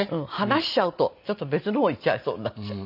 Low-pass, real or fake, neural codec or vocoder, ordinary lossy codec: 5.4 kHz; real; none; none